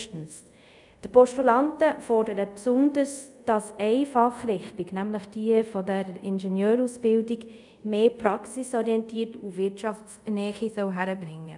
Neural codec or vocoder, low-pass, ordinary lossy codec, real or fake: codec, 24 kHz, 0.5 kbps, DualCodec; 10.8 kHz; none; fake